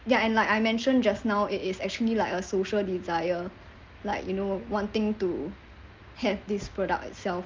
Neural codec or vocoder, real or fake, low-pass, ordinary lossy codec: none; real; 7.2 kHz; Opus, 24 kbps